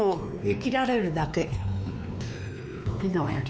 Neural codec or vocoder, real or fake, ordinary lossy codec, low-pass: codec, 16 kHz, 2 kbps, X-Codec, WavLM features, trained on Multilingual LibriSpeech; fake; none; none